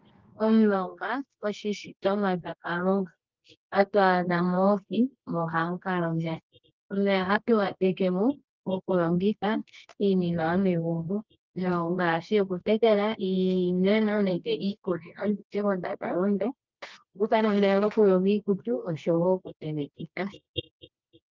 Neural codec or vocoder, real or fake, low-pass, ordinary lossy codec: codec, 24 kHz, 0.9 kbps, WavTokenizer, medium music audio release; fake; 7.2 kHz; Opus, 24 kbps